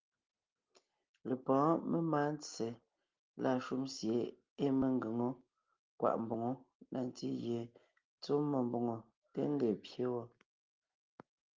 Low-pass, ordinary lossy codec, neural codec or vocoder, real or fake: 7.2 kHz; Opus, 32 kbps; none; real